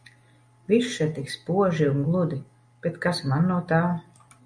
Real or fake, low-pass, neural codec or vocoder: real; 9.9 kHz; none